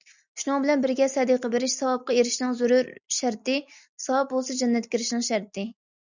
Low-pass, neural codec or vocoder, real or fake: 7.2 kHz; none; real